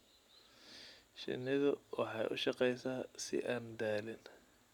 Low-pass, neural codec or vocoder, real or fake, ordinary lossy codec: 19.8 kHz; none; real; none